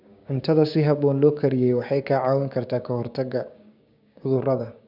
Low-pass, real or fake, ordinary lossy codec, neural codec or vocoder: 5.4 kHz; fake; none; codec, 16 kHz, 6 kbps, DAC